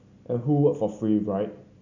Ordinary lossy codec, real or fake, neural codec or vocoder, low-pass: none; real; none; 7.2 kHz